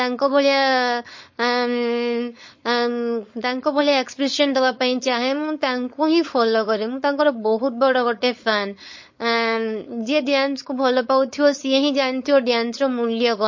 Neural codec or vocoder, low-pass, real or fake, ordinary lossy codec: codec, 16 kHz, 4.8 kbps, FACodec; 7.2 kHz; fake; MP3, 32 kbps